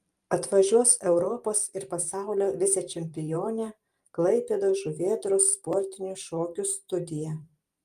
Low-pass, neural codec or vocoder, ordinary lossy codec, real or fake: 14.4 kHz; vocoder, 44.1 kHz, 128 mel bands every 512 samples, BigVGAN v2; Opus, 32 kbps; fake